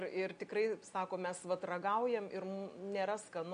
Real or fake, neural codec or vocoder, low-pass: real; none; 9.9 kHz